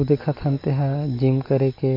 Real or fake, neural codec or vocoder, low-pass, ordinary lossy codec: real; none; 5.4 kHz; AAC, 32 kbps